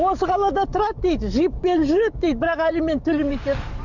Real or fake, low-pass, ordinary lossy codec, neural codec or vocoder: fake; 7.2 kHz; none; codec, 44.1 kHz, 7.8 kbps, DAC